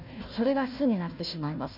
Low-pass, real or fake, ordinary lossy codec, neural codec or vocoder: 5.4 kHz; fake; none; codec, 16 kHz, 1 kbps, FunCodec, trained on Chinese and English, 50 frames a second